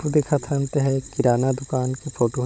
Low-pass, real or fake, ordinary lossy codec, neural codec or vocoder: none; fake; none; codec, 16 kHz, 16 kbps, FunCodec, trained on Chinese and English, 50 frames a second